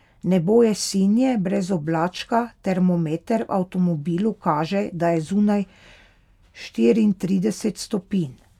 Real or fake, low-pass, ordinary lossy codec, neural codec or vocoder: real; 19.8 kHz; none; none